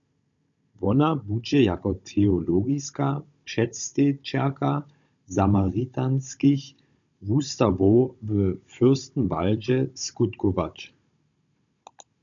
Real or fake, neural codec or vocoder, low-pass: fake; codec, 16 kHz, 16 kbps, FunCodec, trained on Chinese and English, 50 frames a second; 7.2 kHz